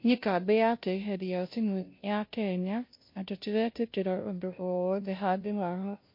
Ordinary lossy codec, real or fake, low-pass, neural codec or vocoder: MP3, 32 kbps; fake; 5.4 kHz; codec, 16 kHz, 0.5 kbps, FunCodec, trained on Chinese and English, 25 frames a second